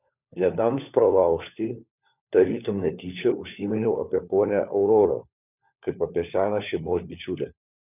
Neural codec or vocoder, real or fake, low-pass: codec, 16 kHz, 4 kbps, FunCodec, trained on LibriTTS, 50 frames a second; fake; 3.6 kHz